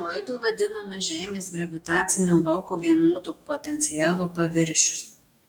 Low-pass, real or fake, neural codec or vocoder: 19.8 kHz; fake; codec, 44.1 kHz, 2.6 kbps, DAC